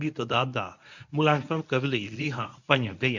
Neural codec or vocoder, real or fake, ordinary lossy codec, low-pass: codec, 24 kHz, 0.9 kbps, WavTokenizer, medium speech release version 1; fake; none; 7.2 kHz